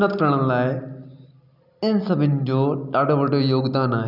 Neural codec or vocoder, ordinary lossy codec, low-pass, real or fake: none; none; 5.4 kHz; real